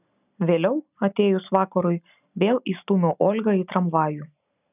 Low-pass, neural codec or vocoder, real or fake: 3.6 kHz; none; real